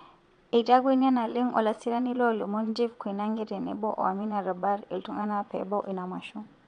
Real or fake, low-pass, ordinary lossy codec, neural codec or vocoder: fake; 9.9 kHz; none; vocoder, 22.05 kHz, 80 mel bands, Vocos